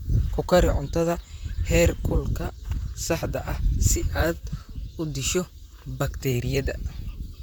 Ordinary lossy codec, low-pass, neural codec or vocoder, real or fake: none; none; vocoder, 44.1 kHz, 128 mel bands, Pupu-Vocoder; fake